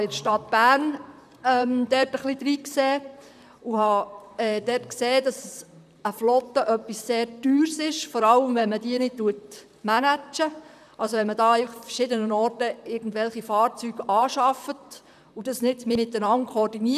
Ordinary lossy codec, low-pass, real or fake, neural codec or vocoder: none; 14.4 kHz; fake; vocoder, 44.1 kHz, 128 mel bands, Pupu-Vocoder